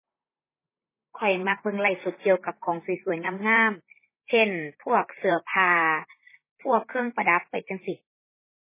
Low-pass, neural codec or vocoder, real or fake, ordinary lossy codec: 3.6 kHz; vocoder, 44.1 kHz, 128 mel bands, Pupu-Vocoder; fake; MP3, 16 kbps